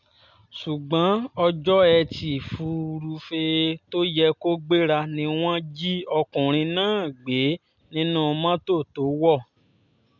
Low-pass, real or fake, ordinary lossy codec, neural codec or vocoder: 7.2 kHz; real; none; none